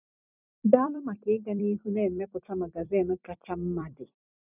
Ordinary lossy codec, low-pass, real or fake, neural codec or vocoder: none; 3.6 kHz; fake; vocoder, 44.1 kHz, 128 mel bands every 256 samples, BigVGAN v2